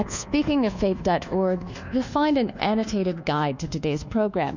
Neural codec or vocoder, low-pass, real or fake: codec, 24 kHz, 1.2 kbps, DualCodec; 7.2 kHz; fake